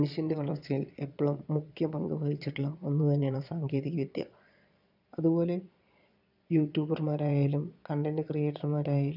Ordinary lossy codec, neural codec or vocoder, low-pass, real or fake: none; vocoder, 22.05 kHz, 80 mel bands, Vocos; 5.4 kHz; fake